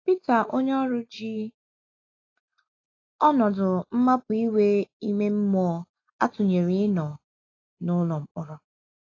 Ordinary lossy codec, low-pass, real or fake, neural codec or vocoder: AAC, 32 kbps; 7.2 kHz; real; none